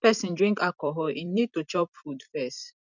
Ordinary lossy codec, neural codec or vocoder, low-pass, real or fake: none; vocoder, 44.1 kHz, 128 mel bands every 256 samples, BigVGAN v2; 7.2 kHz; fake